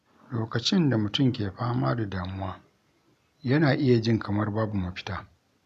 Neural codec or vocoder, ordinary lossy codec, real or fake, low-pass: none; none; real; 14.4 kHz